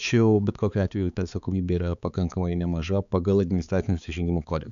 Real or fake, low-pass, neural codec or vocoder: fake; 7.2 kHz; codec, 16 kHz, 4 kbps, X-Codec, HuBERT features, trained on balanced general audio